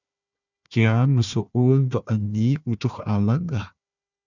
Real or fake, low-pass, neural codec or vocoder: fake; 7.2 kHz; codec, 16 kHz, 1 kbps, FunCodec, trained on Chinese and English, 50 frames a second